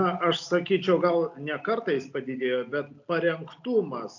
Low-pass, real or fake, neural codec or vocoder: 7.2 kHz; real; none